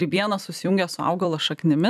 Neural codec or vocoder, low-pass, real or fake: vocoder, 44.1 kHz, 128 mel bands every 256 samples, BigVGAN v2; 14.4 kHz; fake